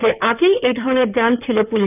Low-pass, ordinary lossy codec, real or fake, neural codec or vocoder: 3.6 kHz; none; fake; codec, 16 kHz in and 24 kHz out, 2.2 kbps, FireRedTTS-2 codec